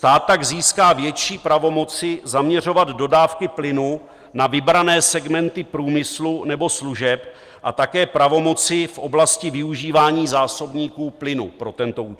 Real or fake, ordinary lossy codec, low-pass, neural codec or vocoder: real; Opus, 24 kbps; 14.4 kHz; none